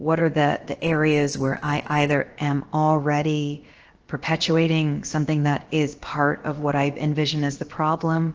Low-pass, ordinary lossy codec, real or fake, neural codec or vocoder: 7.2 kHz; Opus, 16 kbps; fake; codec, 16 kHz, about 1 kbps, DyCAST, with the encoder's durations